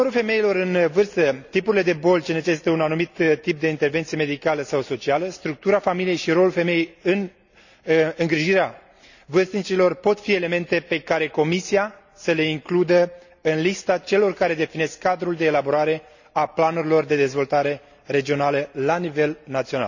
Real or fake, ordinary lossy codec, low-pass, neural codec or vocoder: real; none; 7.2 kHz; none